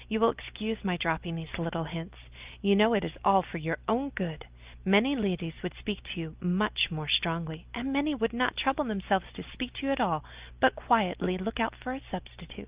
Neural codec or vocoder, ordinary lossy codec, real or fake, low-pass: none; Opus, 32 kbps; real; 3.6 kHz